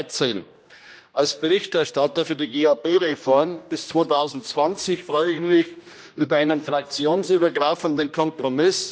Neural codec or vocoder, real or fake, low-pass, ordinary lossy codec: codec, 16 kHz, 1 kbps, X-Codec, HuBERT features, trained on general audio; fake; none; none